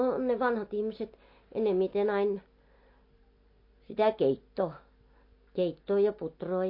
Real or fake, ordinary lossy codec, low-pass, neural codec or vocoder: real; MP3, 32 kbps; 5.4 kHz; none